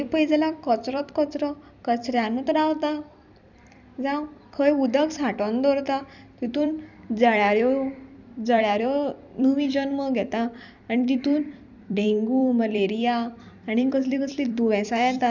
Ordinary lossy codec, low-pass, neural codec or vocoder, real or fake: none; 7.2 kHz; vocoder, 44.1 kHz, 128 mel bands every 256 samples, BigVGAN v2; fake